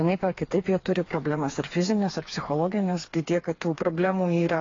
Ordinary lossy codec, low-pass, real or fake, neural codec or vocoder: AAC, 32 kbps; 7.2 kHz; fake; codec, 16 kHz, 4 kbps, FreqCodec, smaller model